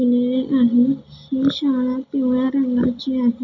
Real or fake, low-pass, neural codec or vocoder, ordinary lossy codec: real; 7.2 kHz; none; none